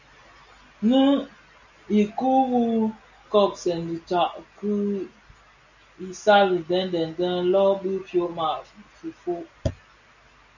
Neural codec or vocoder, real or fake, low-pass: none; real; 7.2 kHz